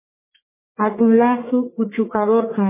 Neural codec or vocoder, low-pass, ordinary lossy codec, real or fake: codec, 44.1 kHz, 1.7 kbps, Pupu-Codec; 3.6 kHz; MP3, 16 kbps; fake